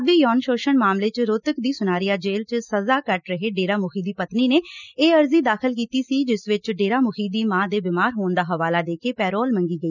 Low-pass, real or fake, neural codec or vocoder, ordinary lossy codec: 7.2 kHz; real; none; none